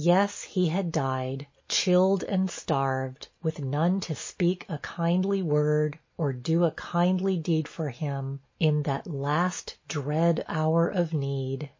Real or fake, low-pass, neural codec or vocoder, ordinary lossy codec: real; 7.2 kHz; none; MP3, 32 kbps